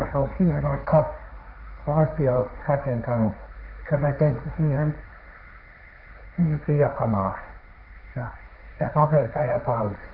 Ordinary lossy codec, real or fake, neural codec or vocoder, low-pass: none; fake; codec, 16 kHz, 1.1 kbps, Voila-Tokenizer; 5.4 kHz